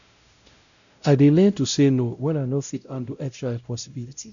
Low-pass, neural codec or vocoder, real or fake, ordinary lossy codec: 7.2 kHz; codec, 16 kHz, 0.5 kbps, X-Codec, WavLM features, trained on Multilingual LibriSpeech; fake; none